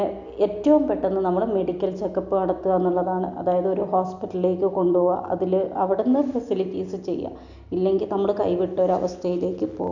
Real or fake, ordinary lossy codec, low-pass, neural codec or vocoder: real; none; 7.2 kHz; none